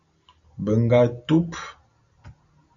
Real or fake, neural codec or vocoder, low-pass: real; none; 7.2 kHz